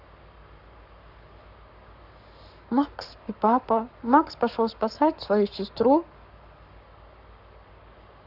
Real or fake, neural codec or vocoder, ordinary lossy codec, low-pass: fake; codec, 44.1 kHz, 7.8 kbps, Pupu-Codec; none; 5.4 kHz